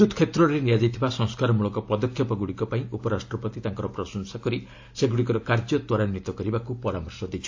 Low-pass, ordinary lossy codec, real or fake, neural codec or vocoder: 7.2 kHz; AAC, 48 kbps; real; none